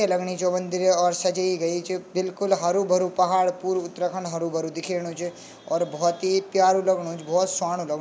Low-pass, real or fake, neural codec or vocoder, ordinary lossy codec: none; real; none; none